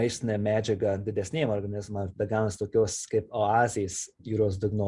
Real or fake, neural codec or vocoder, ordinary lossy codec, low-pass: real; none; Opus, 64 kbps; 10.8 kHz